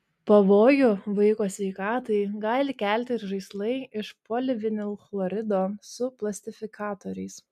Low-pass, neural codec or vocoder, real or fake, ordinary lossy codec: 14.4 kHz; none; real; MP3, 96 kbps